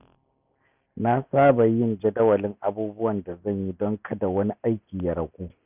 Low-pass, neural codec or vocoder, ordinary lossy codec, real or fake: 3.6 kHz; none; none; real